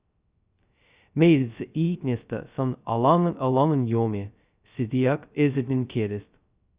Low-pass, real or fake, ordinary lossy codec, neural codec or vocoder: 3.6 kHz; fake; Opus, 64 kbps; codec, 16 kHz, 0.2 kbps, FocalCodec